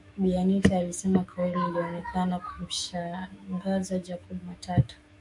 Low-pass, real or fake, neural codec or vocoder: 10.8 kHz; fake; autoencoder, 48 kHz, 128 numbers a frame, DAC-VAE, trained on Japanese speech